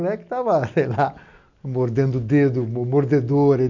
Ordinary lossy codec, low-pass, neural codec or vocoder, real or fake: none; 7.2 kHz; none; real